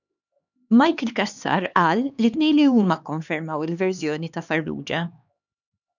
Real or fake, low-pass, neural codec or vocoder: fake; 7.2 kHz; codec, 16 kHz, 2 kbps, X-Codec, HuBERT features, trained on LibriSpeech